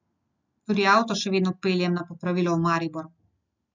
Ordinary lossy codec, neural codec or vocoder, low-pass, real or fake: none; none; 7.2 kHz; real